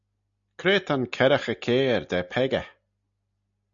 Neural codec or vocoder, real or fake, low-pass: none; real; 7.2 kHz